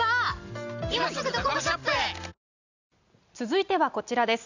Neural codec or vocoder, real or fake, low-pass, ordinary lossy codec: none; real; 7.2 kHz; none